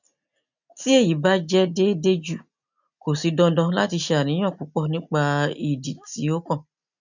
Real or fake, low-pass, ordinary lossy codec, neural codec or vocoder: real; 7.2 kHz; none; none